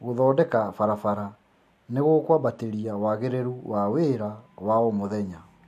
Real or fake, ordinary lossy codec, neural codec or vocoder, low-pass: real; MP3, 64 kbps; none; 14.4 kHz